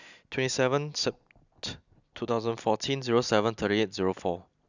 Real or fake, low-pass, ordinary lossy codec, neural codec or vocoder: real; 7.2 kHz; none; none